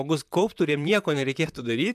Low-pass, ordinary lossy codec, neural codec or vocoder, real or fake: 14.4 kHz; MP3, 96 kbps; vocoder, 44.1 kHz, 128 mel bands, Pupu-Vocoder; fake